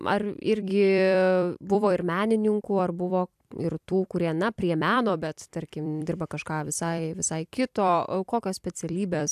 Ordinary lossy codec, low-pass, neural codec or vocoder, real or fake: AAC, 96 kbps; 14.4 kHz; vocoder, 44.1 kHz, 128 mel bands every 256 samples, BigVGAN v2; fake